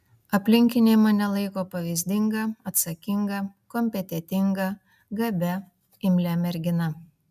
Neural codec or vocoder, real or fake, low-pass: none; real; 14.4 kHz